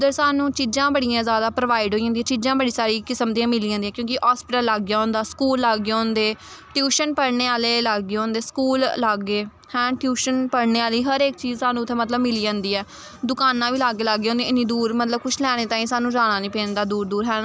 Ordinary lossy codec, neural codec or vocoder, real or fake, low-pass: none; none; real; none